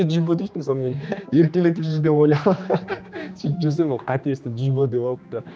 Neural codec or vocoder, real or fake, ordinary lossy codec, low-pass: codec, 16 kHz, 2 kbps, X-Codec, HuBERT features, trained on general audio; fake; none; none